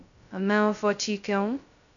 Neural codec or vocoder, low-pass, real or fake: codec, 16 kHz, 0.2 kbps, FocalCodec; 7.2 kHz; fake